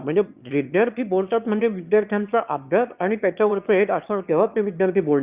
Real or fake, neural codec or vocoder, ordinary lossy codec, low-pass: fake; autoencoder, 22.05 kHz, a latent of 192 numbers a frame, VITS, trained on one speaker; Opus, 64 kbps; 3.6 kHz